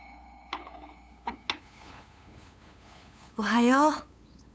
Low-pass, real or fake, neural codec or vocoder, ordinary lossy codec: none; fake; codec, 16 kHz, 8 kbps, FunCodec, trained on LibriTTS, 25 frames a second; none